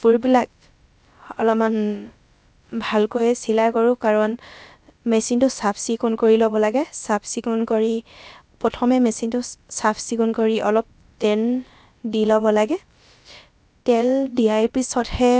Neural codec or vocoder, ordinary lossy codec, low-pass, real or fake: codec, 16 kHz, about 1 kbps, DyCAST, with the encoder's durations; none; none; fake